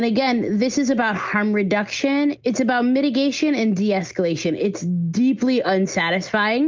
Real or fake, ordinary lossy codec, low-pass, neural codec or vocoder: real; Opus, 32 kbps; 7.2 kHz; none